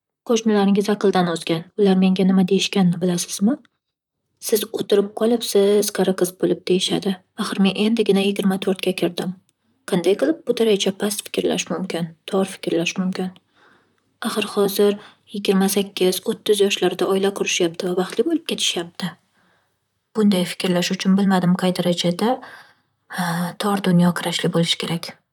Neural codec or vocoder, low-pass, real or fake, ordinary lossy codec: vocoder, 44.1 kHz, 128 mel bands, Pupu-Vocoder; 19.8 kHz; fake; none